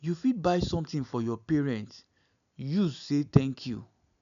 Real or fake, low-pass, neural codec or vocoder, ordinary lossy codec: real; 7.2 kHz; none; none